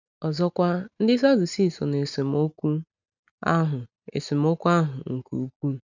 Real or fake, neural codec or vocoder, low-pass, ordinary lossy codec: real; none; 7.2 kHz; none